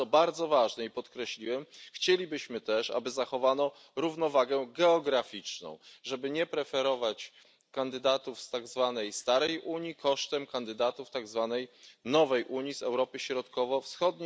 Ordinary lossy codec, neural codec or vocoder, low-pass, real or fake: none; none; none; real